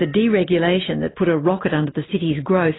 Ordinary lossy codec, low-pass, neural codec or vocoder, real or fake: AAC, 16 kbps; 7.2 kHz; none; real